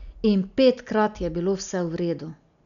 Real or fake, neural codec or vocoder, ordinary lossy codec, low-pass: real; none; none; 7.2 kHz